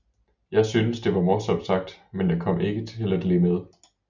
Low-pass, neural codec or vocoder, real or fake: 7.2 kHz; none; real